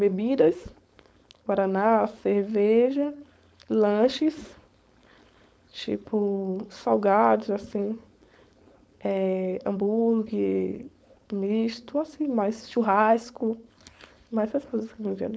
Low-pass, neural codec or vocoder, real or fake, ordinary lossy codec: none; codec, 16 kHz, 4.8 kbps, FACodec; fake; none